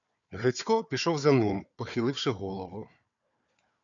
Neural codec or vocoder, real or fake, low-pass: codec, 16 kHz, 4 kbps, FunCodec, trained on Chinese and English, 50 frames a second; fake; 7.2 kHz